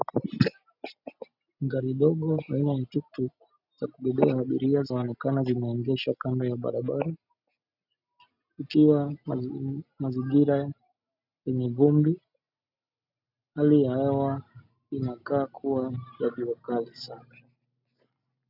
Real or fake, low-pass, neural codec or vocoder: real; 5.4 kHz; none